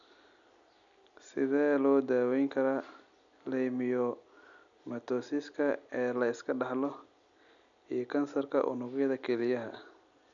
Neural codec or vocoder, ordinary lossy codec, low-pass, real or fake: none; none; 7.2 kHz; real